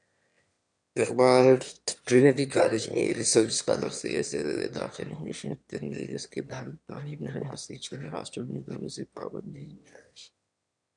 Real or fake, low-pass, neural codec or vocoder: fake; 9.9 kHz; autoencoder, 22.05 kHz, a latent of 192 numbers a frame, VITS, trained on one speaker